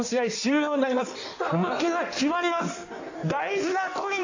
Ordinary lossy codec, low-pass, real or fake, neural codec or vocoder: AAC, 48 kbps; 7.2 kHz; fake; codec, 16 kHz in and 24 kHz out, 1.1 kbps, FireRedTTS-2 codec